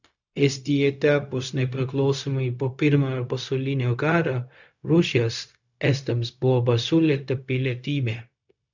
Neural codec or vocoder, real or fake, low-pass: codec, 16 kHz, 0.4 kbps, LongCat-Audio-Codec; fake; 7.2 kHz